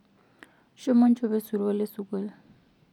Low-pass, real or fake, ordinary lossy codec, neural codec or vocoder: 19.8 kHz; real; none; none